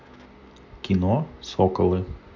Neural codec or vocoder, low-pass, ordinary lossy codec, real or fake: none; 7.2 kHz; MP3, 48 kbps; real